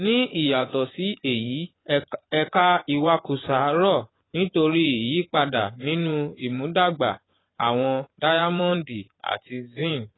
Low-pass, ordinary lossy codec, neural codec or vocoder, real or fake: 7.2 kHz; AAC, 16 kbps; vocoder, 24 kHz, 100 mel bands, Vocos; fake